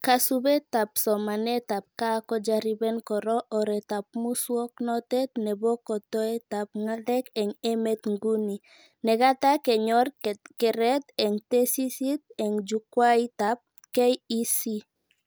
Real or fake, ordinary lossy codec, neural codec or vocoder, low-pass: real; none; none; none